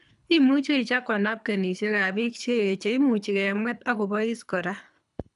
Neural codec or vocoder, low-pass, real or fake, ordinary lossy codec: codec, 24 kHz, 3 kbps, HILCodec; 10.8 kHz; fake; none